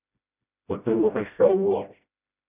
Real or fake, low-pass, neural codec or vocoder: fake; 3.6 kHz; codec, 16 kHz, 0.5 kbps, FreqCodec, smaller model